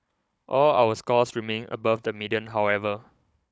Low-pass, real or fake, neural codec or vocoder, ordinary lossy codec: none; fake; codec, 16 kHz, 16 kbps, FunCodec, trained on Chinese and English, 50 frames a second; none